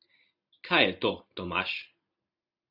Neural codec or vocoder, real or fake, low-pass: none; real; 5.4 kHz